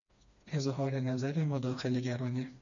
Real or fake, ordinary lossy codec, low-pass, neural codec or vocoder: fake; MP3, 96 kbps; 7.2 kHz; codec, 16 kHz, 2 kbps, FreqCodec, smaller model